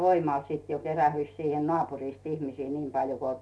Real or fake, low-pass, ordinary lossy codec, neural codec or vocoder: real; none; none; none